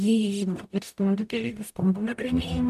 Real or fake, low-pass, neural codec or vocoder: fake; 14.4 kHz; codec, 44.1 kHz, 0.9 kbps, DAC